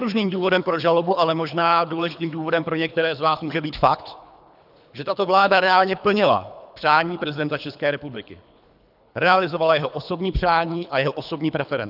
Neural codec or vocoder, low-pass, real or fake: codec, 24 kHz, 3 kbps, HILCodec; 5.4 kHz; fake